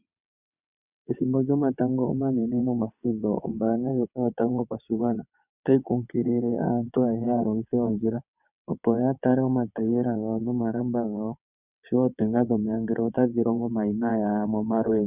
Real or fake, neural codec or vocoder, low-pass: fake; vocoder, 22.05 kHz, 80 mel bands, WaveNeXt; 3.6 kHz